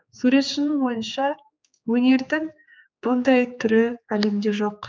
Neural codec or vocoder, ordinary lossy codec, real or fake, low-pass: codec, 16 kHz, 4 kbps, X-Codec, HuBERT features, trained on general audio; none; fake; none